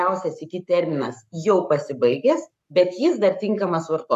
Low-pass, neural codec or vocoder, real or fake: 14.4 kHz; codec, 44.1 kHz, 7.8 kbps, Pupu-Codec; fake